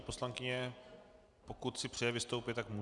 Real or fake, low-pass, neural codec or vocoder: real; 10.8 kHz; none